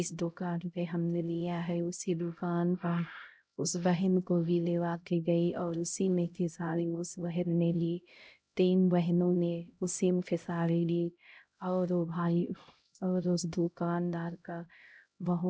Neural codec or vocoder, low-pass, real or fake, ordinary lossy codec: codec, 16 kHz, 0.5 kbps, X-Codec, HuBERT features, trained on LibriSpeech; none; fake; none